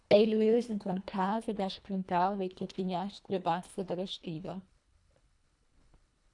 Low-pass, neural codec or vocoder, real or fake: 10.8 kHz; codec, 24 kHz, 1.5 kbps, HILCodec; fake